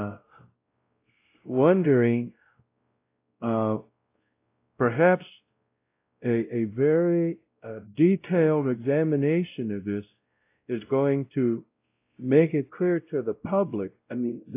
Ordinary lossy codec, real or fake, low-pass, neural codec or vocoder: AAC, 24 kbps; fake; 3.6 kHz; codec, 16 kHz, 0.5 kbps, X-Codec, WavLM features, trained on Multilingual LibriSpeech